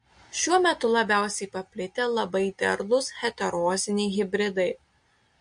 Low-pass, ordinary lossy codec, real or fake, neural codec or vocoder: 9.9 kHz; MP3, 48 kbps; real; none